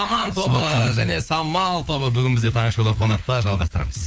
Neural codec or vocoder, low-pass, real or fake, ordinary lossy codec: codec, 16 kHz, 4 kbps, FunCodec, trained on LibriTTS, 50 frames a second; none; fake; none